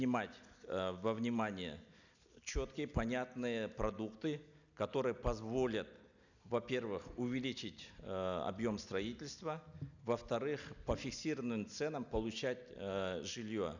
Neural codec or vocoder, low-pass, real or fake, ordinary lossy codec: none; 7.2 kHz; real; none